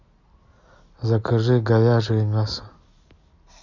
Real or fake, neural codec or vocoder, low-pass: real; none; 7.2 kHz